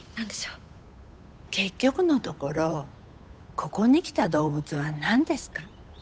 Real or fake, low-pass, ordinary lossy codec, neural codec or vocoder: fake; none; none; codec, 16 kHz, 8 kbps, FunCodec, trained on Chinese and English, 25 frames a second